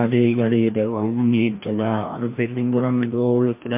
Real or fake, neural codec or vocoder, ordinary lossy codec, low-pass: fake; codec, 16 kHz, 1 kbps, FreqCodec, larger model; MP3, 24 kbps; 3.6 kHz